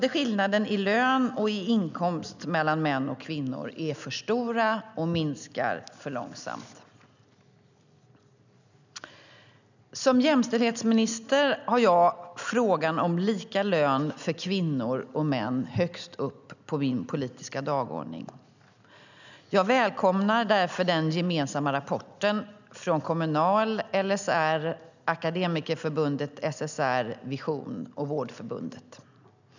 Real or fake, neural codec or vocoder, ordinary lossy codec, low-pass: real; none; none; 7.2 kHz